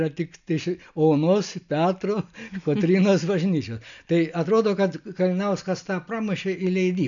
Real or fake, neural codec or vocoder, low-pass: real; none; 7.2 kHz